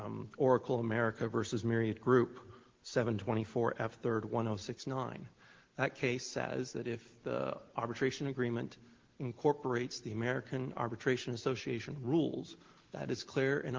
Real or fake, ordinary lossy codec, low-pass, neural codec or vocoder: real; Opus, 16 kbps; 7.2 kHz; none